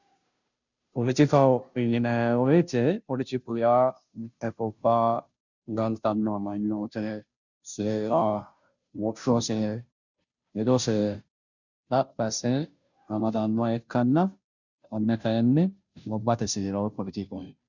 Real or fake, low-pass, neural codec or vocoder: fake; 7.2 kHz; codec, 16 kHz, 0.5 kbps, FunCodec, trained on Chinese and English, 25 frames a second